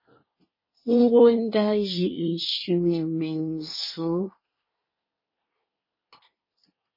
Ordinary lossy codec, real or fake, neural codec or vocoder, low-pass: MP3, 24 kbps; fake; codec, 24 kHz, 1 kbps, SNAC; 5.4 kHz